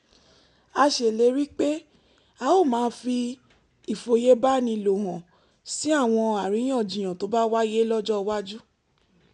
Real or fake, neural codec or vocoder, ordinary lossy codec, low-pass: real; none; none; 10.8 kHz